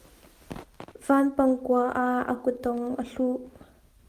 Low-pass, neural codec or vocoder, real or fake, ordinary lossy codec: 14.4 kHz; none; real; Opus, 24 kbps